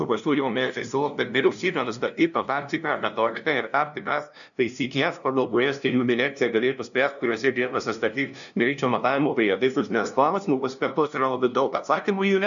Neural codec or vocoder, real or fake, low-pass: codec, 16 kHz, 0.5 kbps, FunCodec, trained on LibriTTS, 25 frames a second; fake; 7.2 kHz